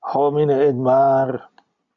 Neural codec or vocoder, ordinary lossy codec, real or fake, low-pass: none; MP3, 64 kbps; real; 7.2 kHz